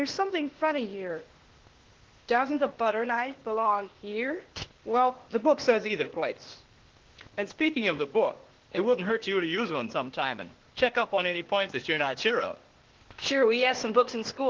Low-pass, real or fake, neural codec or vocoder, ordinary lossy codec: 7.2 kHz; fake; codec, 16 kHz, 0.8 kbps, ZipCodec; Opus, 16 kbps